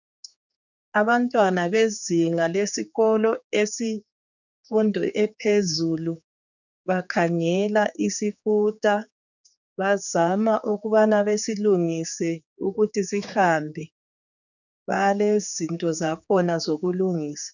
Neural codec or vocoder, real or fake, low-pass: codec, 16 kHz, 4 kbps, X-Codec, HuBERT features, trained on general audio; fake; 7.2 kHz